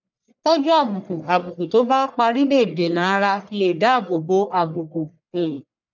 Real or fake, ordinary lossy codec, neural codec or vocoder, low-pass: fake; none; codec, 44.1 kHz, 1.7 kbps, Pupu-Codec; 7.2 kHz